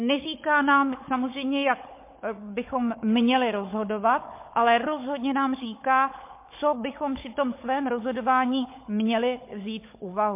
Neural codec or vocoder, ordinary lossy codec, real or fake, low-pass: codec, 16 kHz, 16 kbps, FunCodec, trained on Chinese and English, 50 frames a second; MP3, 24 kbps; fake; 3.6 kHz